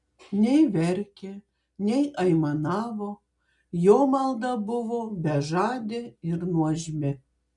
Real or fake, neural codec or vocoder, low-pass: real; none; 10.8 kHz